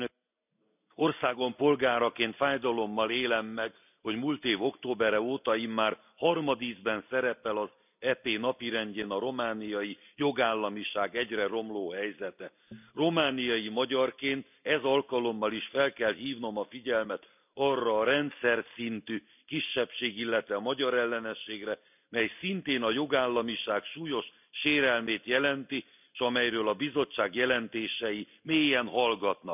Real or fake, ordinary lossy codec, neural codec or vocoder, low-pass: real; none; none; 3.6 kHz